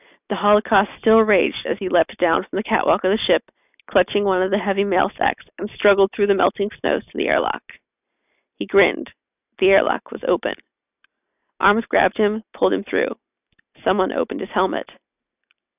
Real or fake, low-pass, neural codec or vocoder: real; 3.6 kHz; none